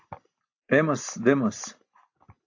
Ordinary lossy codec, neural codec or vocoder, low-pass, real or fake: AAC, 48 kbps; none; 7.2 kHz; real